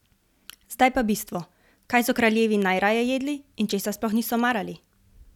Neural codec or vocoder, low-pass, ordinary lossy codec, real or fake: none; 19.8 kHz; none; real